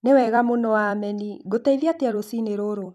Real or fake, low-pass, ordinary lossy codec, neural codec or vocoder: fake; 14.4 kHz; none; vocoder, 44.1 kHz, 128 mel bands every 256 samples, BigVGAN v2